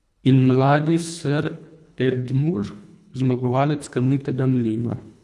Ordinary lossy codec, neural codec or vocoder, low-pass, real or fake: none; codec, 24 kHz, 1.5 kbps, HILCodec; none; fake